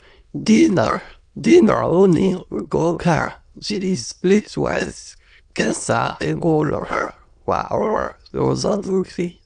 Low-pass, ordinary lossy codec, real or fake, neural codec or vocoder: 9.9 kHz; none; fake; autoencoder, 22.05 kHz, a latent of 192 numbers a frame, VITS, trained on many speakers